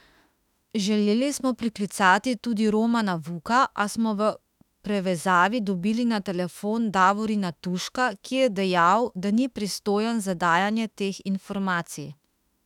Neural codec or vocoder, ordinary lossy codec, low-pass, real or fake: autoencoder, 48 kHz, 32 numbers a frame, DAC-VAE, trained on Japanese speech; none; 19.8 kHz; fake